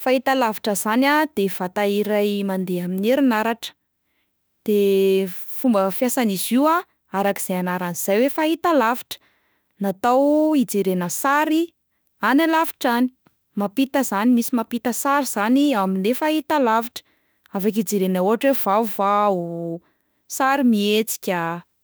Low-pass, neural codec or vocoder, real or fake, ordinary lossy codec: none; autoencoder, 48 kHz, 32 numbers a frame, DAC-VAE, trained on Japanese speech; fake; none